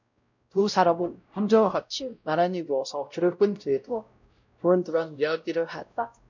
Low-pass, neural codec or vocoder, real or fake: 7.2 kHz; codec, 16 kHz, 0.5 kbps, X-Codec, WavLM features, trained on Multilingual LibriSpeech; fake